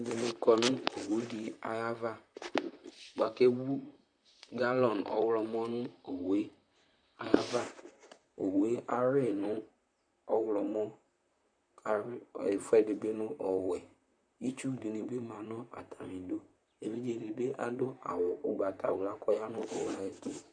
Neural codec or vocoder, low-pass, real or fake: vocoder, 44.1 kHz, 128 mel bands, Pupu-Vocoder; 9.9 kHz; fake